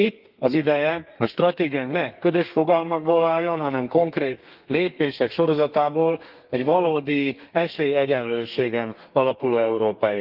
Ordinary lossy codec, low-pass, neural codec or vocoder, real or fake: Opus, 24 kbps; 5.4 kHz; codec, 32 kHz, 1.9 kbps, SNAC; fake